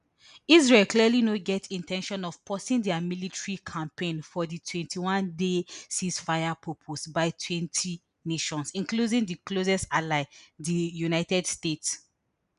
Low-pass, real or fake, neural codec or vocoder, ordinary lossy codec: 10.8 kHz; real; none; none